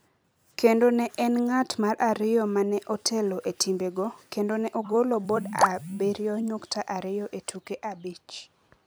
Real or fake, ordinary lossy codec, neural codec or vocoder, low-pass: fake; none; vocoder, 44.1 kHz, 128 mel bands every 256 samples, BigVGAN v2; none